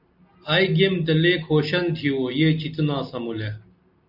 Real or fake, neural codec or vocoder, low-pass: real; none; 5.4 kHz